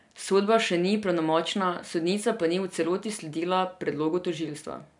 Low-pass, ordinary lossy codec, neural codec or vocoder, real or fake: 10.8 kHz; none; none; real